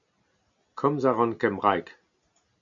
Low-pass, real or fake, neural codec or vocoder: 7.2 kHz; real; none